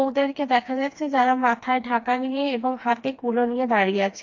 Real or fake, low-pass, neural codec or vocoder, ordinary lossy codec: fake; 7.2 kHz; codec, 16 kHz, 2 kbps, FreqCodec, smaller model; AAC, 48 kbps